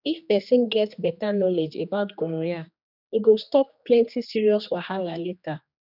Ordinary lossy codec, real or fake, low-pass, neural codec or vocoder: none; fake; 5.4 kHz; codec, 16 kHz, 2 kbps, X-Codec, HuBERT features, trained on general audio